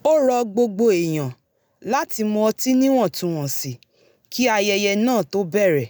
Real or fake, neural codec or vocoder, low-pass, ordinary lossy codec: real; none; none; none